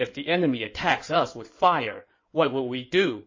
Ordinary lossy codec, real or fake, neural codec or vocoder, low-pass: MP3, 32 kbps; fake; codec, 16 kHz in and 24 kHz out, 2.2 kbps, FireRedTTS-2 codec; 7.2 kHz